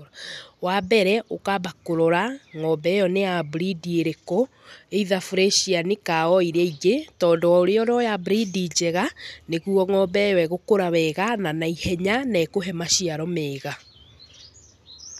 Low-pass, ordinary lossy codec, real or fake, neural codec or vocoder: 14.4 kHz; none; real; none